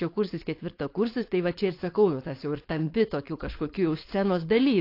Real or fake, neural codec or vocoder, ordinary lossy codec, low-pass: fake; codec, 16 kHz, 4.8 kbps, FACodec; AAC, 32 kbps; 5.4 kHz